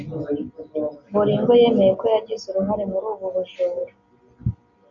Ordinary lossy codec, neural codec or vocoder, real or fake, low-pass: AAC, 64 kbps; none; real; 7.2 kHz